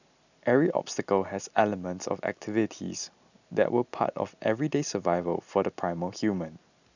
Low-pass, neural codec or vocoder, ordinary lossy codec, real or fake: 7.2 kHz; none; none; real